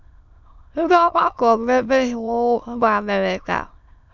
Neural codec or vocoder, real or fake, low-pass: autoencoder, 22.05 kHz, a latent of 192 numbers a frame, VITS, trained on many speakers; fake; 7.2 kHz